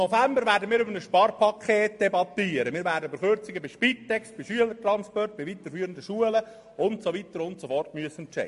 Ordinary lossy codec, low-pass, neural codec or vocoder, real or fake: MP3, 48 kbps; 14.4 kHz; none; real